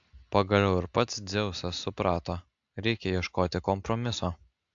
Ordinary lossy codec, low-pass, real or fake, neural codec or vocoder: AAC, 64 kbps; 7.2 kHz; real; none